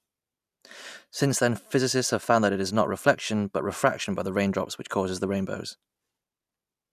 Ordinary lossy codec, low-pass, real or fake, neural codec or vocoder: none; 14.4 kHz; real; none